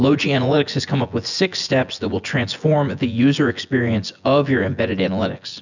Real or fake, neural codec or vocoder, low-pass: fake; vocoder, 24 kHz, 100 mel bands, Vocos; 7.2 kHz